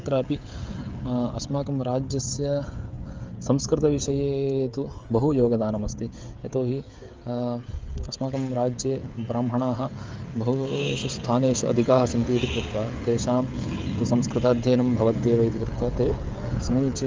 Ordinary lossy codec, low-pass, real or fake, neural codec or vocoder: Opus, 32 kbps; 7.2 kHz; fake; codec, 16 kHz, 16 kbps, FreqCodec, smaller model